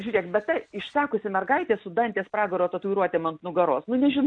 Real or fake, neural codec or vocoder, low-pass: real; none; 9.9 kHz